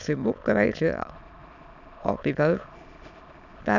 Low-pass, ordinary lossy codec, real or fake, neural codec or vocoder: 7.2 kHz; none; fake; autoencoder, 22.05 kHz, a latent of 192 numbers a frame, VITS, trained on many speakers